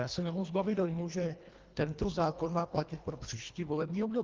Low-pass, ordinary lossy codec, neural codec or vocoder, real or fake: 7.2 kHz; Opus, 32 kbps; codec, 24 kHz, 1.5 kbps, HILCodec; fake